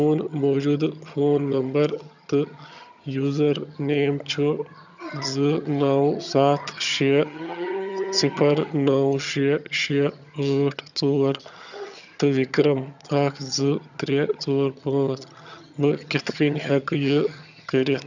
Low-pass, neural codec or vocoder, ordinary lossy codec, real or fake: 7.2 kHz; vocoder, 22.05 kHz, 80 mel bands, HiFi-GAN; none; fake